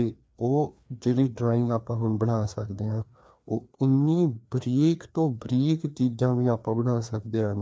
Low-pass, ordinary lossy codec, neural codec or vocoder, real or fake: none; none; codec, 16 kHz, 2 kbps, FreqCodec, larger model; fake